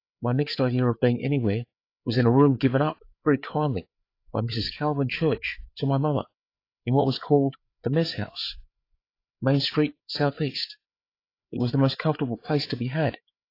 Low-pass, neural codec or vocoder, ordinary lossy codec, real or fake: 5.4 kHz; codec, 16 kHz, 4 kbps, FreqCodec, larger model; AAC, 32 kbps; fake